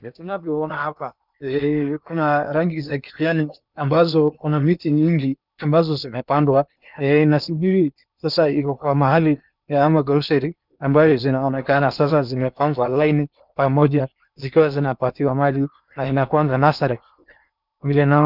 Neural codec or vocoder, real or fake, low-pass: codec, 16 kHz in and 24 kHz out, 0.8 kbps, FocalCodec, streaming, 65536 codes; fake; 5.4 kHz